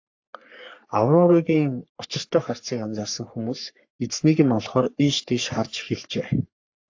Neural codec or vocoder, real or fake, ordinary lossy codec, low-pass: codec, 44.1 kHz, 3.4 kbps, Pupu-Codec; fake; AAC, 48 kbps; 7.2 kHz